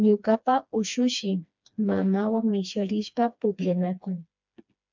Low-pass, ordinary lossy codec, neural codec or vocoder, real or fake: 7.2 kHz; MP3, 64 kbps; codec, 16 kHz, 2 kbps, FreqCodec, smaller model; fake